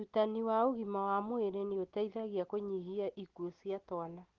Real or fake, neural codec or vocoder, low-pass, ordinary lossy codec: real; none; 7.2 kHz; Opus, 16 kbps